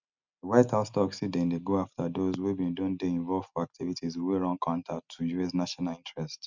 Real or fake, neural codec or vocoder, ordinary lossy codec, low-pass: real; none; none; 7.2 kHz